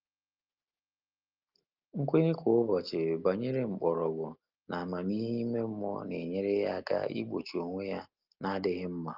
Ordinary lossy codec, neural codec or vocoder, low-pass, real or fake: Opus, 32 kbps; none; 5.4 kHz; real